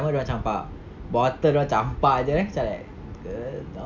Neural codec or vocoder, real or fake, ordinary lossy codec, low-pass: none; real; none; 7.2 kHz